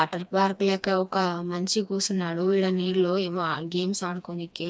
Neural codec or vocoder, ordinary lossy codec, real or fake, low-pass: codec, 16 kHz, 2 kbps, FreqCodec, smaller model; none; fake; none